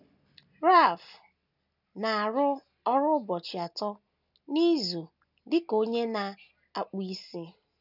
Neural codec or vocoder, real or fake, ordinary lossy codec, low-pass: none; real; none; 5.4 kHz